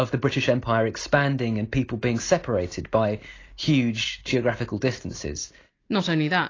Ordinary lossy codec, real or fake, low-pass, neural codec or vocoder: AAC, 32 kbps; real; 7.2 kHz; none